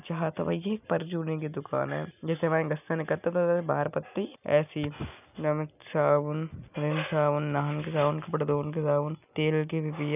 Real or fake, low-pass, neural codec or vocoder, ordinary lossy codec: real; 3.6 kHz; none; none